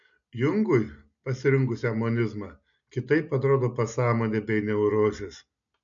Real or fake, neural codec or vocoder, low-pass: real; none; 7.2 kHz